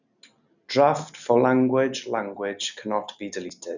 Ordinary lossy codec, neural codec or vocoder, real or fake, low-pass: none; none; real; 7.2 kHz